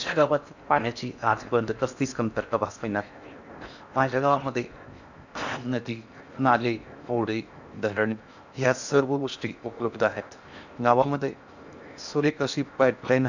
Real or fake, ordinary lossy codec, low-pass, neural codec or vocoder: fake; none; 7.2 kHz; codec, 16 kHz in and 24 kHz out, 0.6 kbps, FocalCodec, streaming, 4096 codes